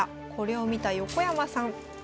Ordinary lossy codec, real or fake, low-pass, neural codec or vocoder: none; real; none; none